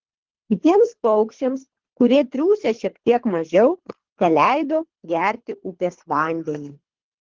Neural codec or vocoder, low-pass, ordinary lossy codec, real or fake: codec, 24 kHz, 3 kbps, HILCodec; 7.2 kHz; Opus, 16 kbps; fake